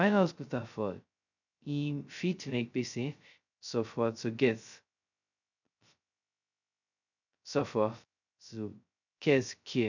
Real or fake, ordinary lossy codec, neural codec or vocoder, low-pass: fake; none; codec, 16 kHz, 0.2 kbps, FocalCodec; 7.2 kHz